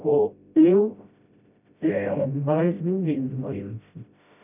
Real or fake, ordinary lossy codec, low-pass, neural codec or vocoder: fake; none; 3.6 kHz; codec, 16 kHz, 0.5 kbps, FreqCodec, smaller model